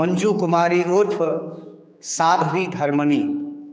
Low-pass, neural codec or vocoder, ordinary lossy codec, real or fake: none; codec, 16 kHz, 4 kbps, X-Codec, HuBERT features, trained on general audio; none; fake